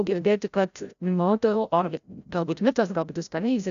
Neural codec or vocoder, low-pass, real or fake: codec, 16 kHz, 0.5 kbps, FreqCodec, larger model; 7.2 kHz; fake